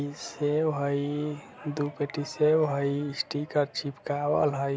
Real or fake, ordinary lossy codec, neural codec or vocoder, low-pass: real; none; none; none